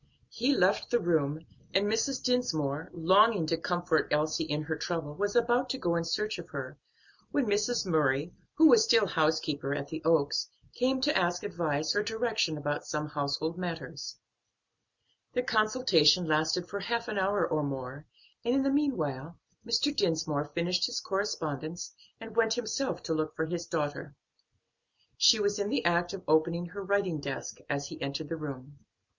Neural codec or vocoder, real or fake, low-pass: none; real; 7.2 kHz